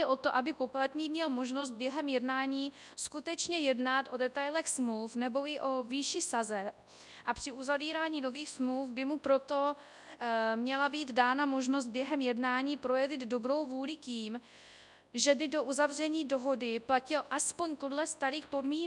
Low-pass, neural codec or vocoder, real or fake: 10.8 kHz; codec, 24 kHz, 0.9 kbps, WavTokenizer, large speech release; fake